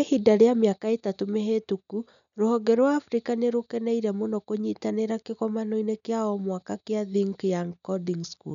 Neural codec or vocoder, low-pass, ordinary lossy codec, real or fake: none; 7.2 kHz; none; real